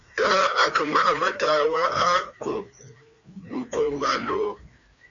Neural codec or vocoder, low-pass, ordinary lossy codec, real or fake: codec, 16 kHz, 2 kbps, FreqCodec, larger model; 7.2 kHz; MP3, 64 kbps; fake